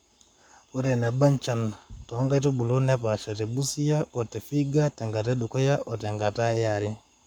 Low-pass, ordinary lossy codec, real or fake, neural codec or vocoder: 19.8 kHz; none; fake; codec, 44.1 kHz, 7.8 kbps, Pupu-Codec